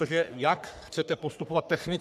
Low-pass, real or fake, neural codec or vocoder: 14.4 kHz; fake; codec, 44.1 kHz, 3.4 kbps, Pupu-Codec